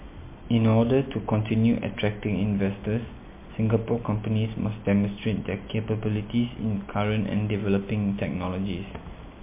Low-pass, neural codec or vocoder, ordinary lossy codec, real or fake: 3.6 kHz; none; MP3, 24 kbps; real